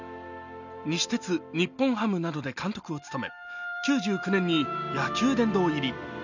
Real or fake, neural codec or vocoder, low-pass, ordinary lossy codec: real; none; 7.2 kHz; none